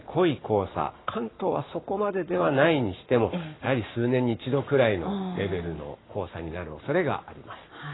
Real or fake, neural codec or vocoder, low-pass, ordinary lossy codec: fake; codec, 44.1 kHz, 7.8 kbps, Pupu-Codec; 7.2 kHz; AAC, 16 kbps